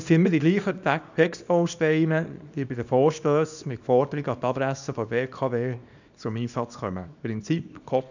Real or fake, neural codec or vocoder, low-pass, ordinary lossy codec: fake; codec, 24 kHz, 0.9 kbps, WavTokenizer, small release; 7.2 kHz; none